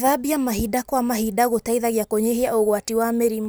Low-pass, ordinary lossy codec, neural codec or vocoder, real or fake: none; none; none; real